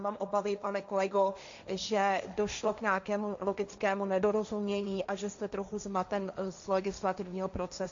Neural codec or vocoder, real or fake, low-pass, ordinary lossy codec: codec, 16 kHz, 1.1 kbps, Voila-Tokenizer; fake; 7.2 kHz; AAC, 64 kbps